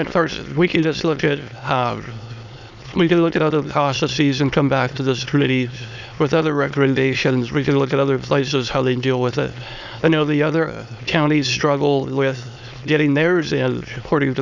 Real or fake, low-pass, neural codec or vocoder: fake; 7.2 kHz; autoencoder, 22.05 kHz, a latent of 192 numbers a frame, VITS, trained on many speakers